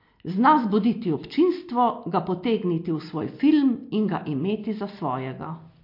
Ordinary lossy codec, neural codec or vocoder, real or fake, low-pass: MP3, 32 kbps; none; real; 5.4 kHz